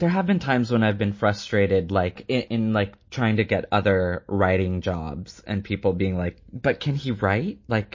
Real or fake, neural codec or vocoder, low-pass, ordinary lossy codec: real; none; 7.2 kHz; MP3, 32 kbps